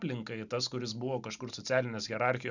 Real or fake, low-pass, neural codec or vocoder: fake; 7.2 kHz; vocoder, 44.1 kHz, 128 mel bands every 256 samples, BigVGAN v2